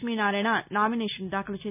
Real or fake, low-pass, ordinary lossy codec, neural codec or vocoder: real; 3.6 kHz; none; none